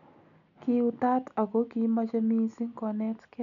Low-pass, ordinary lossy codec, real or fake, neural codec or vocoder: 7.2 kHz; none; real; none